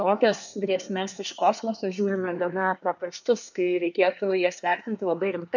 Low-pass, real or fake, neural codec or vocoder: 7.2 kHz; fake; codec, 24 kHz, 1 kbps, SNAC